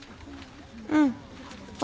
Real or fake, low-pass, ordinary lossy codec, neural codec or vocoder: real; none; none; none